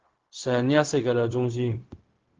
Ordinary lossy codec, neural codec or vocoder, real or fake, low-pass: Opus, 16 kbps; codec, 16 kHz, 0.4 kbps, LongCat-Audio-Codec; fake; 7.2 kHz